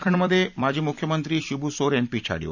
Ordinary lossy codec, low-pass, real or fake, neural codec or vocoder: none; 7.2 kHz; fake; vocoder, 44.1 kHz, 128 mel bands every 256 samples, BigVGAN v2